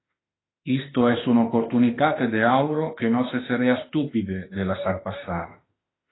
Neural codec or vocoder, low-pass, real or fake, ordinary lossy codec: autoencoder, 48 kHz, 32 numbers a frame, DAC-VAE, trained on Japanese speech; 7.2 kHz; fake; AAC, 16 kbps